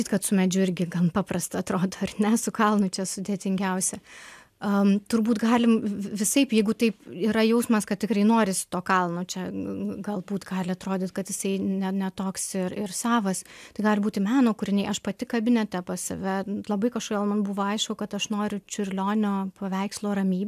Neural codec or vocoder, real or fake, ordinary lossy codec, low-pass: none; real; AAC, 96 kbps; 14.4 kHz